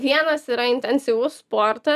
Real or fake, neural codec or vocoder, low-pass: real; none; 14.4 kHz